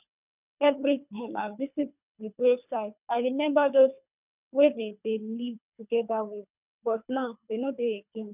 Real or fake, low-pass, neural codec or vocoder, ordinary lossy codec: fake; 3.6 kHz; codec, 24 kHz, 3 kbps, HILCodec; none